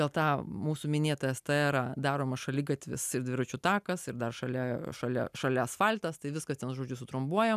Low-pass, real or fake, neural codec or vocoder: 14.4 kHz; real; none